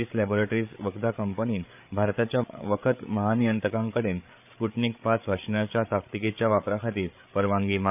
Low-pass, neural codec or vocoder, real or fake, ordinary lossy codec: 3.6 kHz; codec, 16 kHz, 8 kbps, FreqCodec, larger model; fake; none